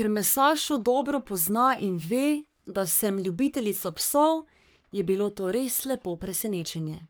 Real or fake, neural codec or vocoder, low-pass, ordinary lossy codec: fake; codec, 44.1 kHz, 3.4 kbps, Pupu-Codec; none; none